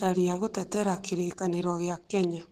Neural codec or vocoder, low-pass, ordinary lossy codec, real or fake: codec, 44.1 kHz, 7.8 kbps, Pupu-Codec; 14.4 kHz; Opus, 16 kbps; fake